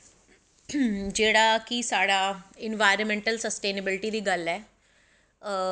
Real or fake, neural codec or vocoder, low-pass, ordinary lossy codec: real; none; none; none